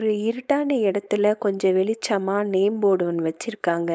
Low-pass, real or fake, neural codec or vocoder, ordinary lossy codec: none; fake; codec, 16 kHz, 4.8 kbps, FACodec; none